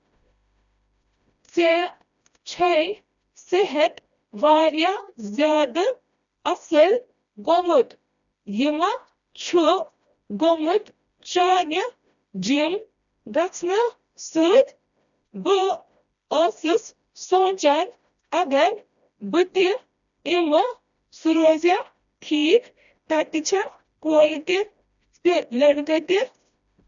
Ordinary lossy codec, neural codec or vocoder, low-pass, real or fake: none; codec, 16 kHz, 1 kbps, FreqCodec, smaller model; 7.2 kHz; fake